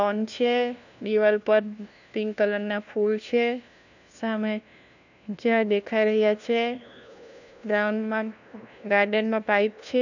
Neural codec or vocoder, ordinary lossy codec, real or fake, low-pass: codec, 16 kHz, 1 kbps, FunCodec, trained on LibriTTS, 50 frames a second; none; fake; 7.2 kHz